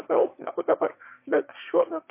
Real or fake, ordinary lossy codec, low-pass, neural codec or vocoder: fake; MP3, 24 kbps; 3.6 kHz; autoencoder, 22.05 kHz, a latent of 192 numbers a frame, VITS, trained on one speaker